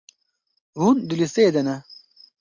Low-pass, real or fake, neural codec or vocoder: 7.2 kHz; real; none